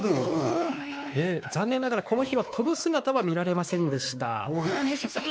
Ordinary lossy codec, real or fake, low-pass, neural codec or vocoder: none; fake; none; codec, 16 kHz, 2 kbps, X-Codec, WavLM features, trained on Multilingual LibriSpeech